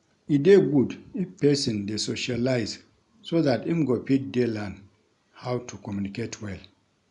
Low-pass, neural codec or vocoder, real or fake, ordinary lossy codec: 10.8 kHz; none; real; none